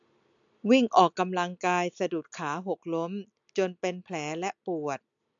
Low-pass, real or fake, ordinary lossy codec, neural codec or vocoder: 7.2 kHz; real; AAC, 64 kbps; none